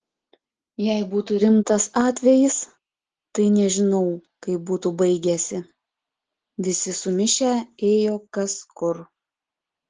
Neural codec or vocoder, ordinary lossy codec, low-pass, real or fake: none; Opus, 16 kbps; 7.2 kHz; real